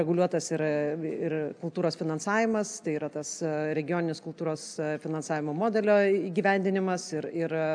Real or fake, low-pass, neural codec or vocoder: real; 9.9 kHz; none